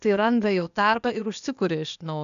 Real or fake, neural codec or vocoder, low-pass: fake; codec, 16 kHz, 0.8 kbps, ZipCodec; 7.2 kHz